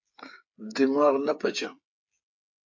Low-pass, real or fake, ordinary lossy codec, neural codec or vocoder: 7.2 kHz; fake; AAC, 48 kbps; codec, 16 kHz, 8 kbps, FreqCodec, smaller model